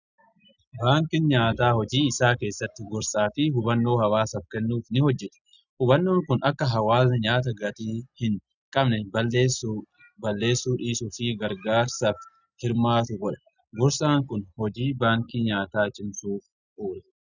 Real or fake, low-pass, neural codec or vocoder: real; 7.2 kHz; none